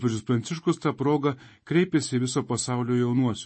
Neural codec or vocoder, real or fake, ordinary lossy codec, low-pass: none; real; MP3, 32 kbps; 9.9 kHz